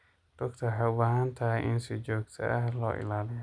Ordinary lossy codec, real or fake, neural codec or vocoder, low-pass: none; real; none; 9.9 kHz